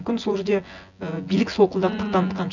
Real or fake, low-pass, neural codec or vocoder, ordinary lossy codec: fake; 7.2 kHz; vocoder, 24 kHz, 100 mel bands, Vocos; none